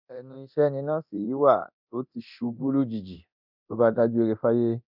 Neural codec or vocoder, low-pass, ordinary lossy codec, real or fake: codec, 24 kHz, 0.9 kbps, DualCodec; 5.4 kHz; none; fake